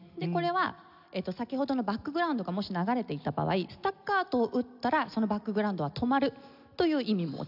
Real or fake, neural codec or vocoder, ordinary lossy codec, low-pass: real; none; none; 5.4 kHz